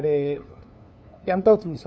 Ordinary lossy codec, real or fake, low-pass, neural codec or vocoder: none; fake; none; codec, 16 kHz, 2 kbps, FunCodec, trained on LibriTTS, 25 frames a second